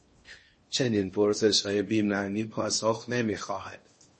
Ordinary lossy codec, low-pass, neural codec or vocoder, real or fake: MP3, 32 kbps; 10.8 kHz; codec, 16 kHz in and 24 kHz out, 0.6 kbps, FocalCodec, streaming, 4096 codes; fake